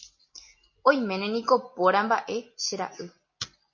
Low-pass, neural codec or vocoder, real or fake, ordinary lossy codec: 7.2 kHz; none; real; MP3, 32 kbps